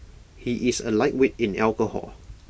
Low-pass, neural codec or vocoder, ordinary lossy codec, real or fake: none; none; none; real